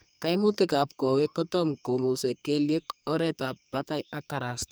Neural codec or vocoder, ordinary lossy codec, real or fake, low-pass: codec, 44.1 kHz, 2.6 kbps, SNAC; none; fake; none